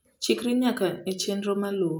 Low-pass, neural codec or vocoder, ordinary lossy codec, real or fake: none; none; none; real